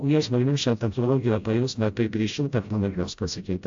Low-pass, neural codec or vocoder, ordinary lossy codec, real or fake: 7.2 kHz; codec, 16 kHz, 0.5 kbps, FreqCodec, smaller model; AAC, 64 kbps; fake